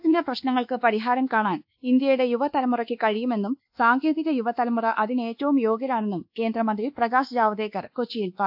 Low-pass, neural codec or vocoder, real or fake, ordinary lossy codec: 5.4 kHz; codec, 24 kHz, 1.2 kbps, DualCodec; fake; AAC, 48 kbps